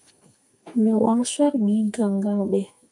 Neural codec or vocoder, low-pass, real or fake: codec, 44.1 kHz, 2.6 kbps, SNAC; 10.8 kHz; fake